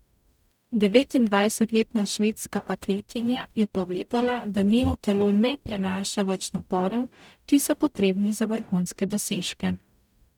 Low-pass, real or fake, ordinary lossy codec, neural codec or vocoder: 19.8 kHz; fake; none; codec, 44.1 kHz, 0.9 kbps, DAC